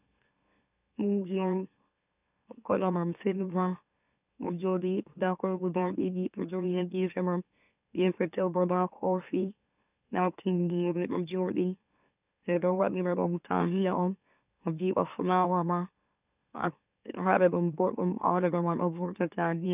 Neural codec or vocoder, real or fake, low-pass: autoencoder, 44.1 kHz, a latent of 192 numbers a frame, MeloTTS; fake; 3.6 kHz